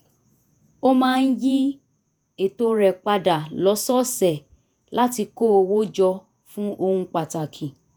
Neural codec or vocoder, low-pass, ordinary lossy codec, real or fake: vocoder, 48 kHz, 128 mel bands, Vocos; none; none; fake